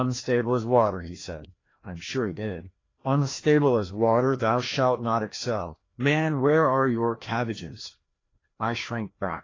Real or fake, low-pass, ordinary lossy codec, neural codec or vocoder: fake; 7.2 kHz; AAC, 32 kbps; codec, 16 kHz, 1 kbps, FreqCodec, larger model